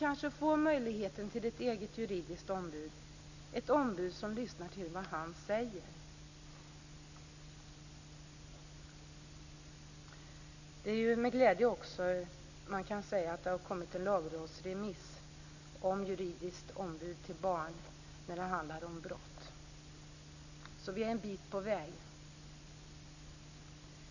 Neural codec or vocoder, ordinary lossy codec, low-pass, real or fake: none; none; 7.2 kHz; real